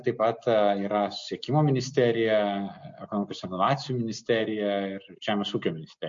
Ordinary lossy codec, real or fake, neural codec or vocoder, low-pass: MP3, 48 kbps; real; none; 7.2 kHz